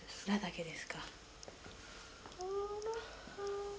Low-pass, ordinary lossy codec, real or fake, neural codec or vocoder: none; none; real; none